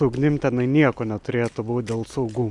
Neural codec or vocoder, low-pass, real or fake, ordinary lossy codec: none; 10.8 kHz; real; Opus, 64 kbps